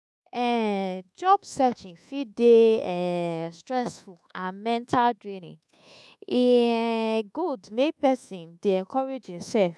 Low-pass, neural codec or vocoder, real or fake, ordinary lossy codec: none; codec, 24 kHz, 1.2 kbps, DualCodec; fake; none